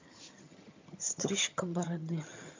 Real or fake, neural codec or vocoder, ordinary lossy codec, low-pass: fake; vocoder, 22.05 kHz, 80 mel bands, HiFi-GAN; MP3, 48 kbps; 7.2 kHz